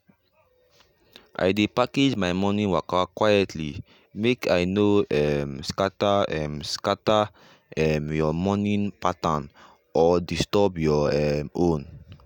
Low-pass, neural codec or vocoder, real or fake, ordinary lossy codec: 19.8 kHz; none; real; none